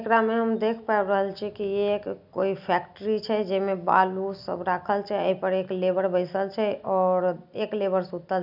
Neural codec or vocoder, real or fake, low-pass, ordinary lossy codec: none; real; 5.4 kHz; none